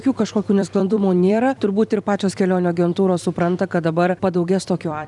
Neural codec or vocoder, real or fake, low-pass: vocoder, 44.1 kHz, 128 mel bands every 256 samples, BigVGAN v2; fake; 10.8 kHz